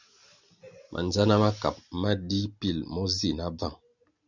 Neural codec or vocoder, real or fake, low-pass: none; real; 7.2 kHz